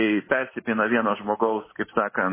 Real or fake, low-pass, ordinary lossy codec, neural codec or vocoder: real; 3.6 kHz; MP3, 16 kbps; none